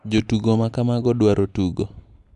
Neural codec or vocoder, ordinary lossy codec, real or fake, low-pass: none; MP3, 96 kbps; real; 10.8 kHz